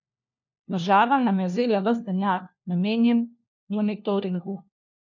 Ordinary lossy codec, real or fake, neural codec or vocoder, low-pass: none; fake; codec, 16 kHz, 1 kbps, FunCodec, trained on LibriTTS, 50 frames a second; 7.2 kHz